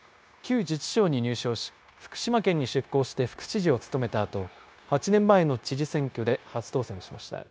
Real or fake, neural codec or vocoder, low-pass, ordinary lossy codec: fake; codec, 16 kHz, 0.9 kbps, LongCat-Audio-Codec; none; none